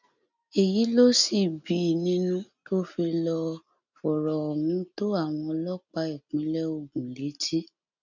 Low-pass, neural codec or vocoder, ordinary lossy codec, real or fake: 7.2 kHz; none; none; real